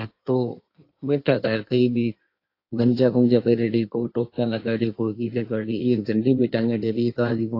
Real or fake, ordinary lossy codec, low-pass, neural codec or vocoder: fake; AAC, 32 kbps; 5.4 kHz; codec, 16 kHz in and 24 kHz out, 1.1 kbps, FireRedTTS-2 codec